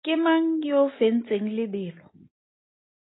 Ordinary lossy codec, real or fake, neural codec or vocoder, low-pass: AAC, 16 kbps; real; none; 7.2 kHz